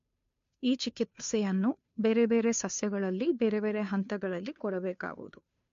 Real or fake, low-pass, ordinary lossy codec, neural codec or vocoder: fake; 7.2 kHz; MP3, 48 kbps; codec, 16 kHz, 2 kbps, FunCodec, trained on Chinese and English, 25 frames a second